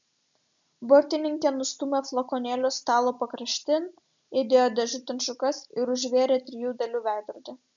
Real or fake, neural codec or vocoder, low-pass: real; none; 7.2 kHz